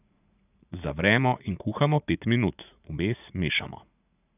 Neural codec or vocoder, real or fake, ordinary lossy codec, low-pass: vocoder, 44.1 kHz, 80 mel bands, Vocos; fake; none; 3.6 kHz